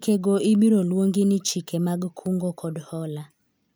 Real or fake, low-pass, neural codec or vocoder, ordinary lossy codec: real; none; none; none